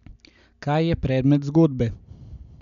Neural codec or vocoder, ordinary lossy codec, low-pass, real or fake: none; none; 7.2 kHz; real